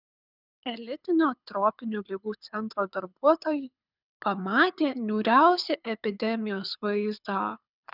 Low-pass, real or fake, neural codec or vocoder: 5.4 kHz; fake; codec, 24 kHz, 6 kbps, HILCodec